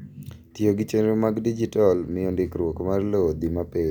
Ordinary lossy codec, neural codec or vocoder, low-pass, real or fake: none; none; 19.8 kHz; real